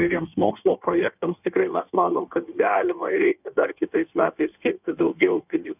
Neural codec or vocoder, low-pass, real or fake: codec, 16 kHz in and 24 kHz out, 1.1 kbps, FireRedTTS-2 codec; 3.6 kHz; fake